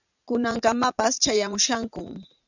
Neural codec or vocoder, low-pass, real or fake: vocoder, 44.1 kHz, 80 mel bands, Vocos; 7.2 kHz; fake